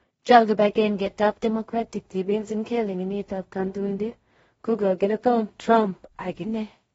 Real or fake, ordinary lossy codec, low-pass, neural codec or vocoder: fake; AAC, 24 kbps; 10.8 kHz; codec, 16 kHz in and 24 kHz out, 0.4 kbps, LongCat-Audio-Codec, two codebook decoder